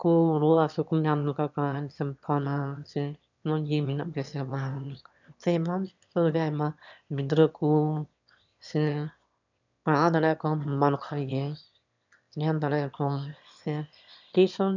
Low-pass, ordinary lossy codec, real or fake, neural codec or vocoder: 7.2 kHz; none; fake; autoencoder, 22.05 kHz, a latent of 192 numbers a frame, VITS, trained on one speaker